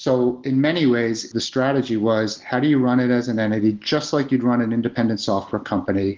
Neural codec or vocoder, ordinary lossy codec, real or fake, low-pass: none; Opus, 16 kbps; real; 7.2 kHz